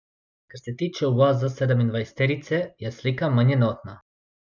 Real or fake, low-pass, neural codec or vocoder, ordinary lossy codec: real; 7.2 kHz; none; none